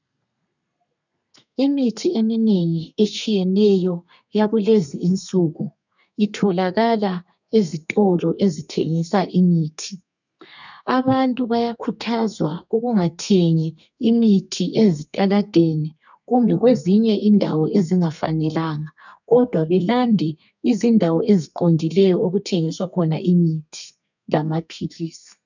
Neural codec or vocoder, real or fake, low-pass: codec, 32 kHz, 1.9 kbps, SNAC; fake; 7.2 kHz